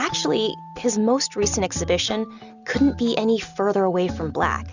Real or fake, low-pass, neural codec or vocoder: real; 7.2 kHz; none